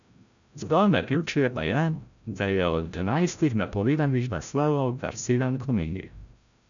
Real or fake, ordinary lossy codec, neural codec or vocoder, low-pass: fake; none; codec, 16 kHz, 0.5 kbps, FreqCodec, larger model; 7.2 kHz